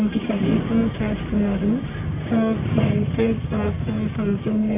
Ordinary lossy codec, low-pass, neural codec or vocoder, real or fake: MP3, 32 kbps; 3.6 kHz; codec, 44.1 kHz, 1.7 kbps, Pupu-Codec; fake